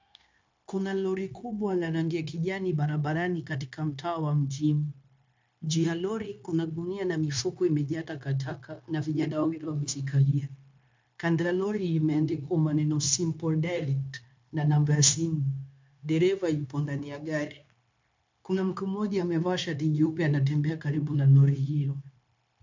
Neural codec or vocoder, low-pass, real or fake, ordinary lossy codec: codec, 16 kHz, 0.9 kbps, LongCat-Audio-Codec; 7.2 kHz; fake; MP3, 64 kbps